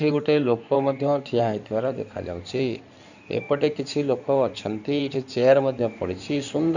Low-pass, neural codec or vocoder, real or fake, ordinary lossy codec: 7.2 kHz; codec, 16 kHz in and 24 kHz out, 2.2 kbps, FireRedTTS-2 codec; fake; none